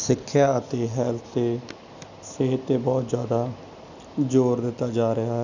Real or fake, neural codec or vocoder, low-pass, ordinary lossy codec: real; none; 7.2 kHz; none